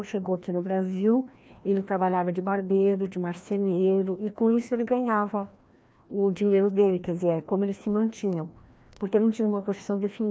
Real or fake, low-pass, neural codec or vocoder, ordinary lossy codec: fake; none; codec, 16 kHz, 1 kbps, FreqCodec, larger model; none